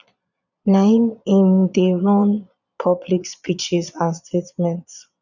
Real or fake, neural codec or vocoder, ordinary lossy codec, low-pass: fake; vocoder, 22.05 kHz, 80 mel bands, Vocos; none; 7.2 kHz